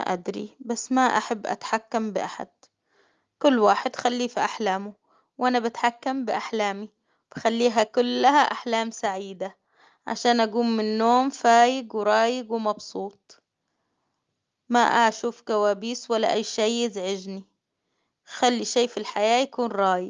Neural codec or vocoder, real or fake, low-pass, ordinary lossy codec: none; real; 7.2 kHz; Opus, 32 kbps